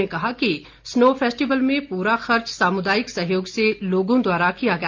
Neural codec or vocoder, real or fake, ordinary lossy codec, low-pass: none; real; Opus, 32 kbps; 7.2 kHz